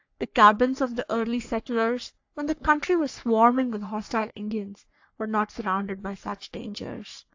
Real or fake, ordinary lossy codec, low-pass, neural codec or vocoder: fake; AAC, 48 kbps; 7.2 kHz; codec, 44.1 kHz, 3.4 kbps, Pupu-Codec